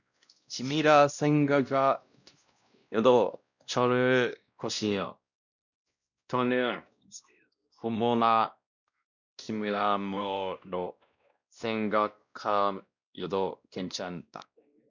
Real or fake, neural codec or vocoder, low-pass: fake; codec, 16 kHz, 1 kbps, X-Codec, WavLM features, trained on Multilingual LibriSpeech; 7.2 kHz